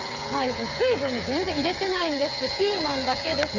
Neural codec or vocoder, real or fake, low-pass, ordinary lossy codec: codec, 16 kHz, 8 kbps, FreqCodec, smaller model; fake; 7.2 kHz; none